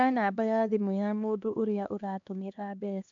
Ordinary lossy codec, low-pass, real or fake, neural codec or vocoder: MP3, 64 kbps; 7.2 kHz; fake; codec, 16 kHz, 2 kbps, X-Codec, HuBERT features, trained on LibriSpeech